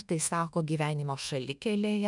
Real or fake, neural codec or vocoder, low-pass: fake; codec, 24 kHz, 1.2 kbps, DualCodec; 10.8 kHz